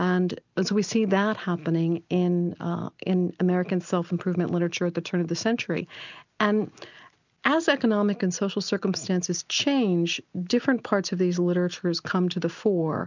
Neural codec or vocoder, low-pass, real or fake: none; 7.2 kHz; real